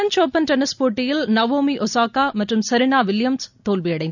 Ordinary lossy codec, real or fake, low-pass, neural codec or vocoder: none; real; 7.2 kHz; none